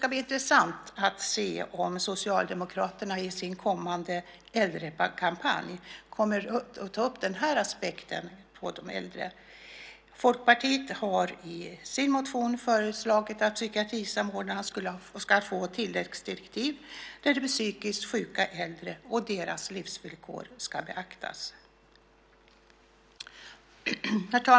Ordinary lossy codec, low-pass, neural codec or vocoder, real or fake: none; none; none; real